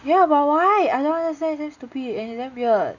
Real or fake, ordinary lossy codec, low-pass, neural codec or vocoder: real; none; 7.2 kHz; none